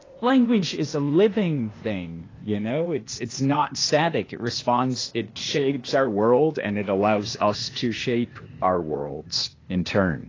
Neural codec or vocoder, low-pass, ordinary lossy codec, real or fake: codec, 16 kHz, 0.8 kbps, ZipCodec; 7.2 kHz; AAC, 32 kbps; fake